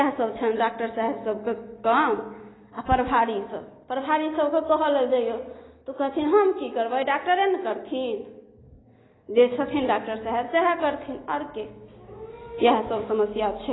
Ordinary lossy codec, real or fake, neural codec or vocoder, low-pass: AAC, 16 kbps; real; none; 7.2 kHz